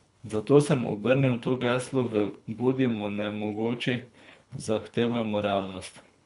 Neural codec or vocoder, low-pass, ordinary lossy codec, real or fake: codec, 24 kHz, 3 kbps, HILCodec; 10.8 kHz; none; fake